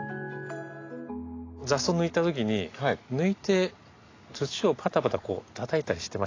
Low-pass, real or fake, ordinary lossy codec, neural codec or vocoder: 7.2 kHz; real; AAC, 32 kbps; none